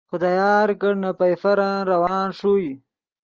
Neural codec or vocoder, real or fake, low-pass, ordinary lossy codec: none; real; 7.2 kHz; Opus, 24 kbps